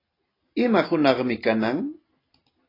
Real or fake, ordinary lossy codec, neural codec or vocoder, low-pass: real; AAC, 24 kbps; none; 5.4 kHz